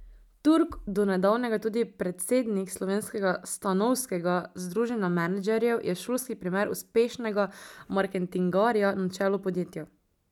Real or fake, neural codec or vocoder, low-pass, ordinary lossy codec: real; none; 19.8 kHz; none